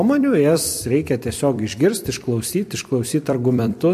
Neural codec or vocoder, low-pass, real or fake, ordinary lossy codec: vocoder, 44.1 kHz, 128 mel bands every 256 samples, BigVGAN v2; 14.4 kHz; fake; MP3, 64 kbps